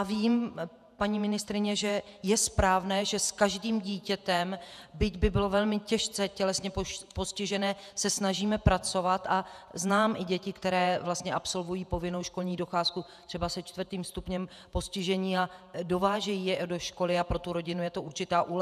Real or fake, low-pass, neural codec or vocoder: fake; 14.4 kHz; vocoder, 48 kHz, 128 mel bands, Vocos